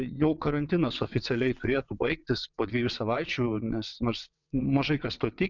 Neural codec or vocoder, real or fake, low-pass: vocoder, 22.05 kHz, 80 mel bands, WaveNeXt; fake; 7.2 kHz